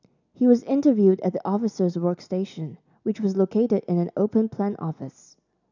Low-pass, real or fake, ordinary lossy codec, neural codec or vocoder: 7.2 kHz; real; none; none